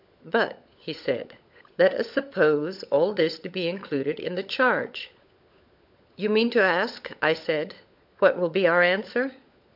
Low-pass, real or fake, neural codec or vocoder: 5.4 kHz; fake; codec, 16 kHz, 16 kbps, FunCodec, trained on LibriTTS, 50 frames a second